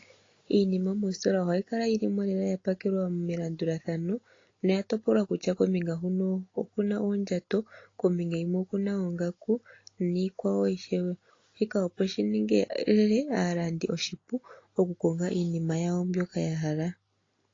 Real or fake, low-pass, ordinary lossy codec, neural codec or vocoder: real; 7.2 kHz; AAC, 32 kbps; none